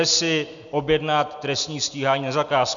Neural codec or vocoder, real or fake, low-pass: none; real; 7.2 kHz